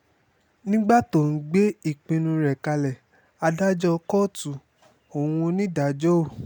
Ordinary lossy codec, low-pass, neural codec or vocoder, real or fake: none; 19.8 kHz; none; real